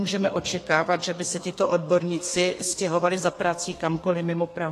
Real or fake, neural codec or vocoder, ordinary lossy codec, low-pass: fake; codec, 32 kHz, 1.9 kbps, SNAC; AAC, 48 kbps; 14.4 kHz